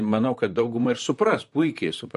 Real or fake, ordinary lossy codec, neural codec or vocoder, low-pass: fake; MP3, 48 kbps; vocoder, 44.1 kHz, 128 mel bands, Pupu-Vocoder; 14.4 kHz